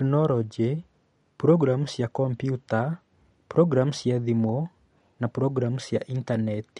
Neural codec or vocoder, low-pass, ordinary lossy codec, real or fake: none; 19.8 kHz; MP3, 48 kbps; real